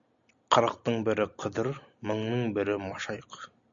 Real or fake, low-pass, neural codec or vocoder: real; 7.2 kHz; none